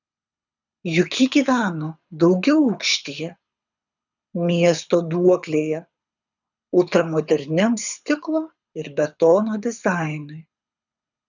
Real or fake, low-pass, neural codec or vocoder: fake; 7.2 kHz; codec, 24 kHz, 6 kbps, HILCodec